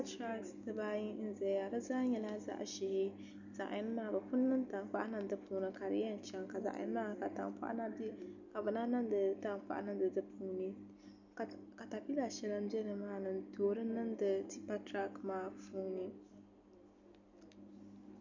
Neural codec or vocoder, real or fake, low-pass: none; real; 7.2 kHz